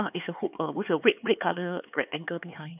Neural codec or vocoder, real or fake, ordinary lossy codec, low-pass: codec, 16 kHz, 4 kbps, X-Codec, HuBERT features, trained on LibriSpeech; fake; none; 3.6 kHz